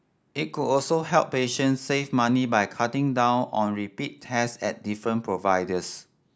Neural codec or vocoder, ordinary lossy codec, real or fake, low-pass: none; none; real; none